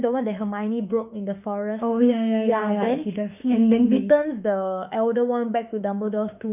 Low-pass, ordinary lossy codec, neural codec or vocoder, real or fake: 3.6 kHz; none; autoencoder, 48 kHz, 32 numbers a frame, DAC-VAE, trained on Japanese speech; fake